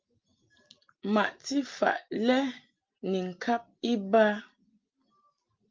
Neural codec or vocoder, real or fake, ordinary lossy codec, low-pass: none; real; Opus, 24 kbps; 7.2 kHz